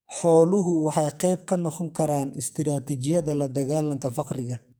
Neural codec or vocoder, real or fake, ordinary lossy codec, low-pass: codec, 44.1 kHz, 2.6 kbps, SNAC; fake; none; none